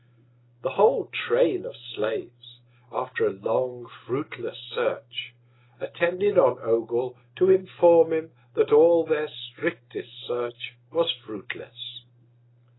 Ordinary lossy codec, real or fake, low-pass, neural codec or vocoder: AAC, 16 kbps; real; 7.2 kHz; none